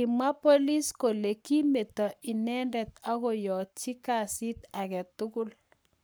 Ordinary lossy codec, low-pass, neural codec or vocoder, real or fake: none; none; codec, 44.1 kHz, 7.8 kbps, Pupu-Codec; fake